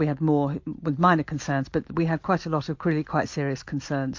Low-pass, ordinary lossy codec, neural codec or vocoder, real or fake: 7.2 kHz; MP3, 48 kbps; none; real